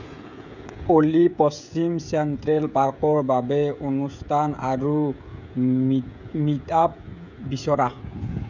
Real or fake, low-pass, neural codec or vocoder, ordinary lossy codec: fake; 7.2 kHz; codec, 16 kHz, 16 kbps, FreqCodec, smaller model; none